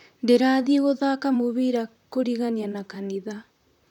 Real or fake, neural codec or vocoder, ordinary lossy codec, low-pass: fake; vocoder, 44.1 kHz, 128 mel bands, Pupu-Vocoder; none; 19.8 kHz